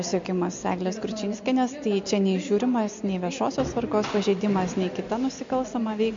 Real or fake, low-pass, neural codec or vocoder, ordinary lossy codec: real; 7.2 kHz; none; MP3, 48 kbps